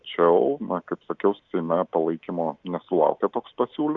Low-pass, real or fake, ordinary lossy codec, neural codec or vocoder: 7.2 kHz; real; Opus, 64 kbps; none